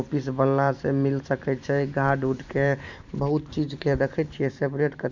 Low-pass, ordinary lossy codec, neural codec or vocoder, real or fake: 7.2 kHz; MP3, 64 kbps; vocoder, 44.1 kHz, 128 mel bands every 512 samples, BigVGAN v2; fake